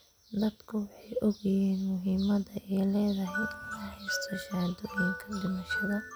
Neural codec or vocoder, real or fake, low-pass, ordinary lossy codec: none; real; none; none